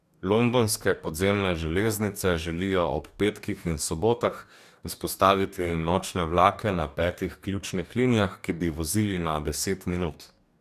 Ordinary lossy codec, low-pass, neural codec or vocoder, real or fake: none; 14.4 kHz; codec, 44.1 kHz, 2.6 kbps, DAC; fake